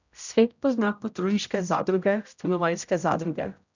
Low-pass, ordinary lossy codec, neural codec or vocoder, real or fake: 7.2 kHz; none; codec, 16 kHz, 0.5 kbps, X-Codec, HuBERT features, trained on general audio; fake